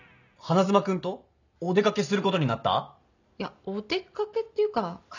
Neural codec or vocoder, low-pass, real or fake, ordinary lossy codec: none; 7.2 kHz; real; none